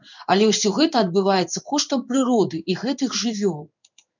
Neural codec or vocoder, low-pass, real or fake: codec, 16 kHz in and 24 kHz out, 1 kbps, XY-Tokenizer; 7.2 kHz; fake